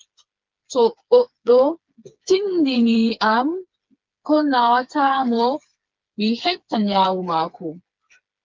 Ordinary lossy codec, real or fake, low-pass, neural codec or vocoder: Opus, 32 kbps; fake; 7.2 kHz; codec, 16 kHz, 4 kbps, FreqCodec, smaller model